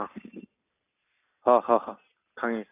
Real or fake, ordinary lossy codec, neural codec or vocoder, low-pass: real; AAC, 24 kbps; none; 3.6 kHz